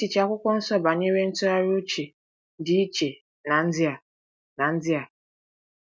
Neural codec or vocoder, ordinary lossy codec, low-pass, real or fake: none; none; none; real